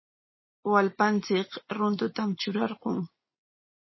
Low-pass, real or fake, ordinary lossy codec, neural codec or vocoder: 7.2 kHz; real; MP3, 24 kbps; none